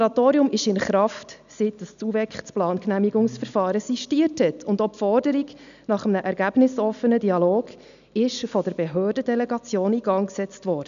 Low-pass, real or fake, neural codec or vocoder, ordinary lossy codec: 7.2 kHz; real; none; none